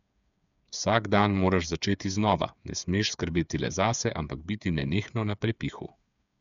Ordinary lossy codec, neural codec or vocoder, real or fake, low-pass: none; codec, 16 kHz, 8 kbps, FreqCodec, smaller model; fake; 7.2 kHz